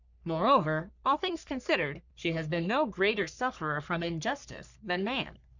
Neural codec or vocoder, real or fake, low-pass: codec, 44.1 kHz, 3.4 kbps, Pupu-Codec; fake; 7.2 kHz